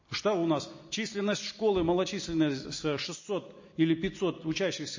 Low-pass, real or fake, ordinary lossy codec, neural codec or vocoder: 7.2 kHz; real; MP3, 32 kbps; none